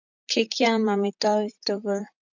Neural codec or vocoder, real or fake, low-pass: vocoder, 44.1 kHz, 128 mel bands, Pupu-Vocoder; fake; 7.2 kHz